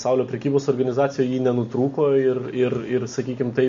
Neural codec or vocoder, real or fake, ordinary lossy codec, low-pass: none; real; MP3, 48 kbps; 7.2 kHz